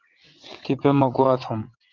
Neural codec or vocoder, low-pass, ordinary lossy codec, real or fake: vocoder, 22.05 kHz, 80 mel bands, WaveNeXt; 7.2 kHz; Opus, 24 kbps; fake